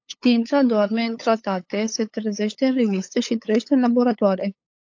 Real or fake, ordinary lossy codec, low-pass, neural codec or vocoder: fake; AAC, 48 kbps; 7.2 kHz; codec, 16 kHz, 8 kbps, FunCodec, trained on LibriTTS, 25 frames a second